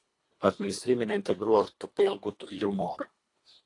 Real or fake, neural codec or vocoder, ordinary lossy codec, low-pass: fake; codec, 24 kHz, 1.5 kbps, HILCodec; AAC, 48 kbps; 10.8 kHz